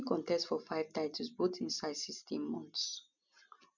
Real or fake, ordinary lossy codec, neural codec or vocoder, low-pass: real; none; none; 7.2 kHz